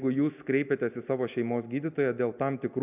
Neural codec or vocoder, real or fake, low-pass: none; real; 3.6 kHz